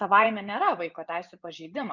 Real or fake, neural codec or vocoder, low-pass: fake; vocoder, 24 kHz, 100 mel bands, Vocos; 7.2 kHz